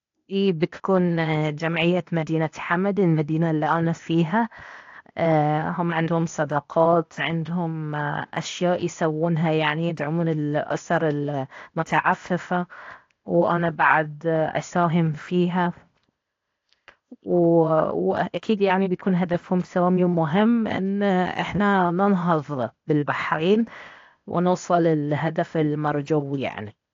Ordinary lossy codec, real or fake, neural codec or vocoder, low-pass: AAC, 48 kbps; fake; codec, 16 kHz, 0.8 kbps, ZipCodec; 7.2 kHz